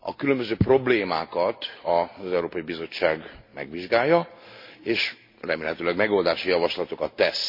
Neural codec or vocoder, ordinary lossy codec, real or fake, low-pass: none; MP3, 32 kbps; real; 5.4 kHz